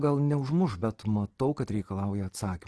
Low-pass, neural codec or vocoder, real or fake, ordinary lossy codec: 10.8 kHz; none; real; Opus, 16 kbps